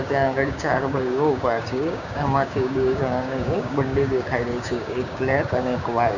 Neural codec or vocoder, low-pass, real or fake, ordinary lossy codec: codec, 16 kHz, 6 kbps, DAC; 7.2 kHz; fake; none